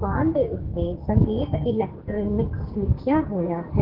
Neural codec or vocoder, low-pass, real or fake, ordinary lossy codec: codec, 44.1 kHz, 2.6 kbps, SNAC; 5.4 kHz; fake; Opus, 16 kbps